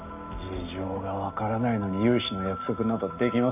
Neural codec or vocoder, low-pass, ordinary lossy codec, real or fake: none; 3.6 kHz; none; real